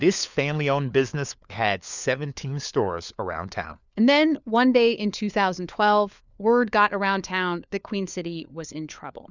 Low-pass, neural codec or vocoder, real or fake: 7.2 kHz; codec, 16 kHz, 4 kbps, FunCodec, trained on LibriTTS, 50 frames a second; fake